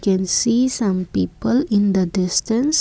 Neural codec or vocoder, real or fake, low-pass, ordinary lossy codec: none; real; none; none